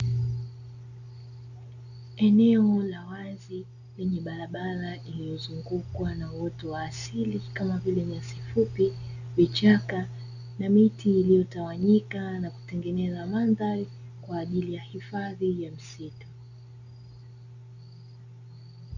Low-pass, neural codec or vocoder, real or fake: 7.2 kHz; none; real